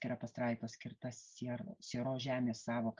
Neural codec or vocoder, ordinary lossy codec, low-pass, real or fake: none; Opus, 24 kbps; 7.2 kHz; real